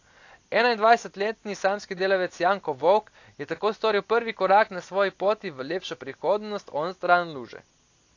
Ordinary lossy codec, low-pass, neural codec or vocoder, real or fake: AAC, 48 kbps; 7.2 kHz; none; real